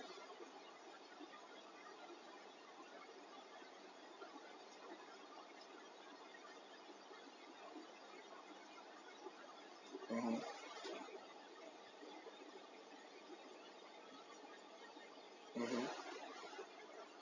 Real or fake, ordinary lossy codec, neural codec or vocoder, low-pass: fake; none; vocoder, 44.1 kHz, 128 mel bands every 256 samples, BigVGAN v2; 7.2 kHz